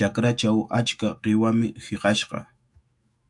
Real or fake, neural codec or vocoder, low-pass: fake; autoencoder, 48 kHz, 128 numbers a frame, DAC-VAE, trained on Japanese speech; 10.8 kHz